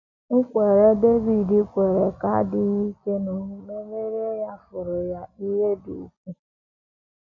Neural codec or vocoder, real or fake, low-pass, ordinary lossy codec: none; real; 7.2 kHz; none